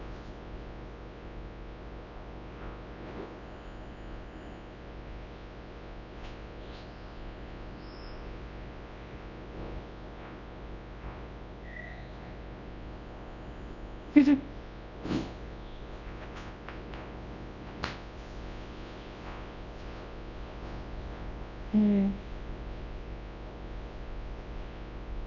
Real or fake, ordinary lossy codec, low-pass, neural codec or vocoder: fake; none; 7.2 kHz; codec, 24 kHz, 0.9 kbps, WavTokenizer, large speech release